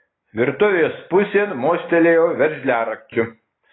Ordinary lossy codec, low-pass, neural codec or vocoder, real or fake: AAC, 16 kbps; 7.2 kHz; none; real